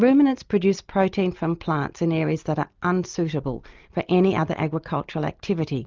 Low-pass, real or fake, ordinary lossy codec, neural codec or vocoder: 7.2 kHz; real; Opus, 24 kbps; none